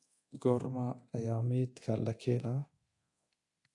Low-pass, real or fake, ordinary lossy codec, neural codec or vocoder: 10.8 kHz; fake; AAC, 48 kbps; codec, 24 kHz, 0.9 kbps, DualCodec